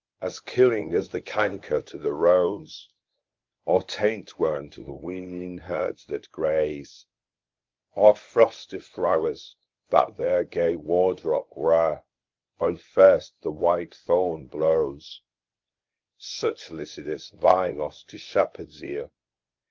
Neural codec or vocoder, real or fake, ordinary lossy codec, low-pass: codec, 24 kHz, 0.9 kbps, WavTokenizer, medium speech release version 1; fake; Opus, 24 kbps; 7.2 kHz